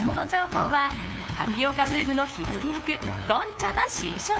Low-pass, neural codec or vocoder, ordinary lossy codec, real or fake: none; codec, 16 kHz, 2 kbps, FunCodec, trained on LibriTTS, 25 frames a second; none; fake